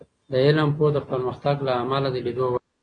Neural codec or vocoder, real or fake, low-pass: none; real; 9.9 kHz